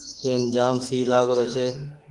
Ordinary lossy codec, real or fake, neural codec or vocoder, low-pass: Opus, 24 kbps; fake; autoencoder, 48 kHz, 32 numbers a frame, DAC-VAE, trained on Japanese speech; 10.8 kHz